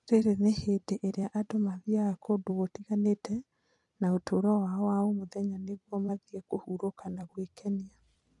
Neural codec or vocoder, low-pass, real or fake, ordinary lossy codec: vocoder, 44.1 kHz, 128 mel bands every 256 samples, BigVGAN v2; 10.8 kHz; fake; none